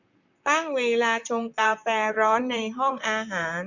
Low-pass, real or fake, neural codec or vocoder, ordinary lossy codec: 7.2 kHz; fake; vocoder, 44.1 kHz, 128 mel bands, Pupu-Vocoder; none